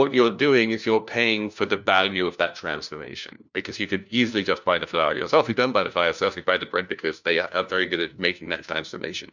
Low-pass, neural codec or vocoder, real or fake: 7.2 kHz; codec, 16 kHz, 1 kbps, FunCodec, trained on LibriTTS, 50 frames a second; fake